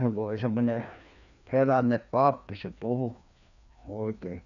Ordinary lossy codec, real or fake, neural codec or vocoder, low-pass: none; fake; codec, 16 kHz, 2 kbps, FreqCodec, larger model; 7.2 kHz